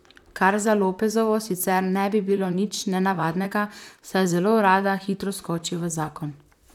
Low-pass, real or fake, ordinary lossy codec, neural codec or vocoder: 19.8 kHz; fake; none; vocoder, 44.1 kHz, 128 mel bands, Pupu-Vocoder